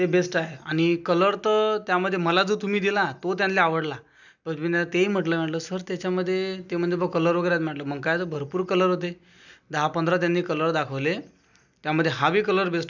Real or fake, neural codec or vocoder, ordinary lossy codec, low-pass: real; none; none; 7.2 kHz